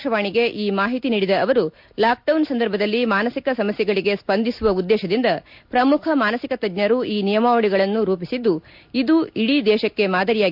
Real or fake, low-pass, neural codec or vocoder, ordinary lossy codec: real; 5.4 kHz; none; MP3, 48 kbps